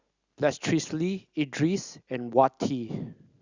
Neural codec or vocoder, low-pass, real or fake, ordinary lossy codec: none; 7.2 kHz; real; Opus, 64 kbps